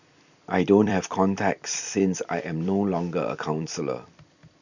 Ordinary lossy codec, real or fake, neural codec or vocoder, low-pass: none; fake; vocoder, 44.1 kHz, 128 mel bands every 256 samples, BigVGAN v2; 7.2 kHz